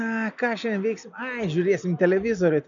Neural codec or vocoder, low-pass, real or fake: none; 7.2 kHz; real